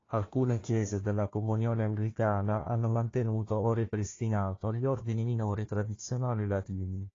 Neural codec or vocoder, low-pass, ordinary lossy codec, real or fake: codec, 16 kHz, 1 kbps, FunCodec, trained on Chinese and English, 50 frames a second; 7.2 kHz; AAC, 32 kbps; fake